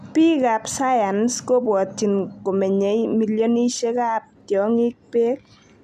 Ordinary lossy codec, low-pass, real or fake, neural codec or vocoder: none; 14.4 kHz; real; none